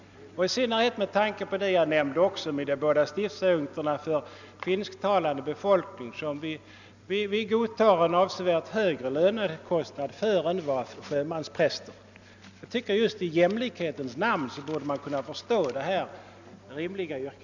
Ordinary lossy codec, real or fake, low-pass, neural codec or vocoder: none; real; 7.2 kHz; none